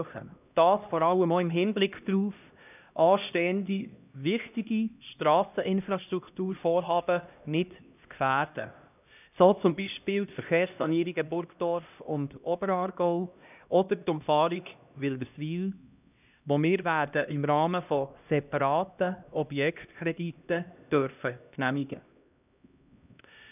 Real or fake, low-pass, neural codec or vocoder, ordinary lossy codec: fake; 3.6 kHz; codec, 16 kHz, 1 kbps, X-Codec, HuBERT features, trained on LibriSpeech; none